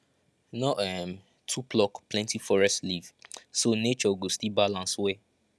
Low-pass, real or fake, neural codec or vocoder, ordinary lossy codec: none; real; none; none